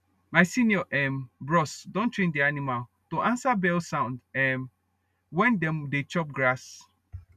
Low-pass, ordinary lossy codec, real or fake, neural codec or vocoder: 14.4 kHz; none; real; none